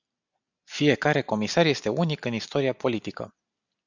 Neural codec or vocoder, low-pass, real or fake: none; 7.2 kHz; real